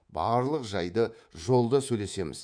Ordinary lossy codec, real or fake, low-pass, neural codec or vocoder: MP3, 96 kbps; fake; 9.9 kHz; codec, 24 kHz, 3.1 kbps, DualCodec